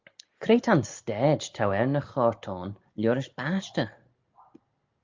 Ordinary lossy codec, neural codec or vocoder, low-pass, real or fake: Opus, 32 kbps; none; 7.2 kHz; real